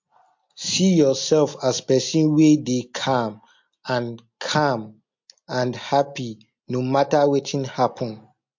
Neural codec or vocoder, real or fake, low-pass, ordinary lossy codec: none; real; 7.2 kHz; MP3, 48 kbps